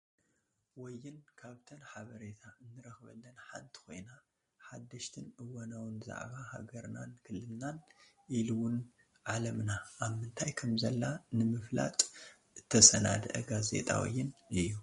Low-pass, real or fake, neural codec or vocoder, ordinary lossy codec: 10.8 kHz; real; none; MP3, 48 kbps